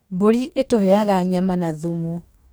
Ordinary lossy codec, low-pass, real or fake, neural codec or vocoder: none; none; fake; codec, 44.1 kHz, 2.6 kbps, DAC